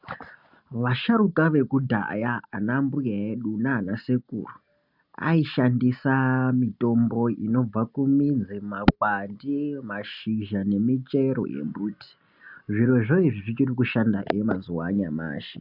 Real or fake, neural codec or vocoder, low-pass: real; none; 5.4 kHz